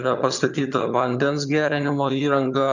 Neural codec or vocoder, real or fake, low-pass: vocoder, 22.05 kHz, 80 mel bands, HiFi-GAN; fake; 7.2 kHz